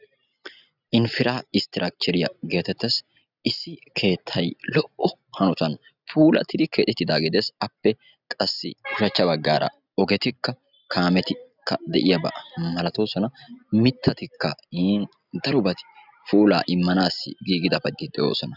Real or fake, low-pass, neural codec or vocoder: real; 5.4 kHz; none